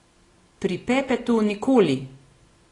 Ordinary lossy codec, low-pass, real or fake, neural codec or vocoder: AAC, 32 kbps; 10.8 kHz; real; none